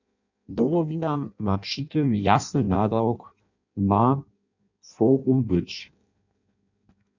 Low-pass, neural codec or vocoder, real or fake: 7.2 kHz; codec, 16 kHz in and 24 kHz out, 0.6 kbps, FireRedTTS-2 codec; fake